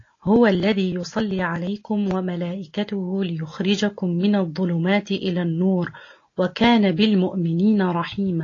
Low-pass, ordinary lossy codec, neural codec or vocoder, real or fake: 7.2 kHz; AAC, 32 kbps; none; real